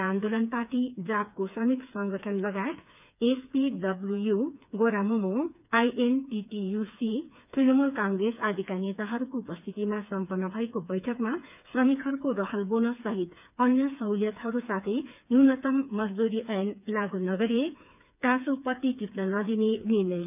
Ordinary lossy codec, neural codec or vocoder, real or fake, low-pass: none; codec, 16 kHz, 4 kbps, FreqCodec, smaller model; fake; 3.6 kHz